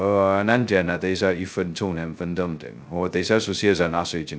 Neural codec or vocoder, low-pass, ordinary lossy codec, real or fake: codec, 16 kHz, 0.2 kbps, FocalCodec; none; none; fake